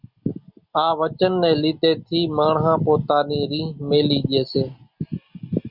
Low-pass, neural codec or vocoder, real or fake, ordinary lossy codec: 5.4 kHz; none; real; Opus, 64 kbps